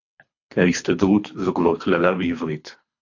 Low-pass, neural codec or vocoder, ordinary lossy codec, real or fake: 7.2 kHz; codec, 24 kHz, 3 kbps, HILCodec; AAC, 48 kbps; fake